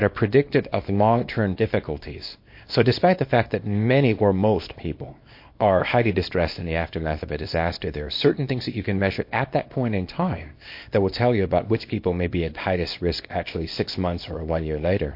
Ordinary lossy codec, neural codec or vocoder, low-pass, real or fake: MP3, 32 kbps; codec, 24 kHz, 0.9 kbps, WavTokenizer, small release; 5.4 kHz; fake